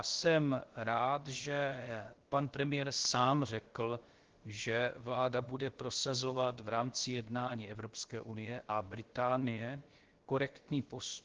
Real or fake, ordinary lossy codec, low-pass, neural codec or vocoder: fake; Opus, 16 kbps; 7.2 kHz; codec, 16 kHz, about 1 kbps, DyCAST, with the encoder's durations